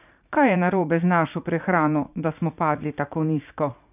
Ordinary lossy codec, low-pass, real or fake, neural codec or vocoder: none; 3.6 kHz; fake; vocoder, 44.1 kHz, 80 mel bands, Vocos